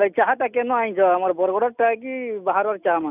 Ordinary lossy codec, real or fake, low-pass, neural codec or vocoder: none; real; 3.6 kHz; none